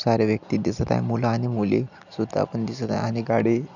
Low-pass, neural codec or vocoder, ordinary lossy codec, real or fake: 7.2 kHz; none; none; real